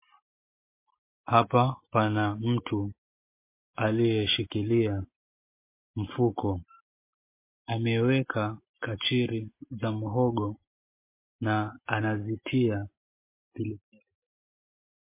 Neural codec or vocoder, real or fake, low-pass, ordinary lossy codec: none; real; 3.6 kHz; MP3, 24 kbps